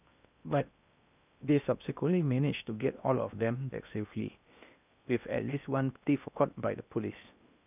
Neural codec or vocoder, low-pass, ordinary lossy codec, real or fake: codec, 16 kHz in and 24 kHz out, 0.6 kbps, FocalCodec, streaming, 2048 codes; 3.6 kHz; none; fake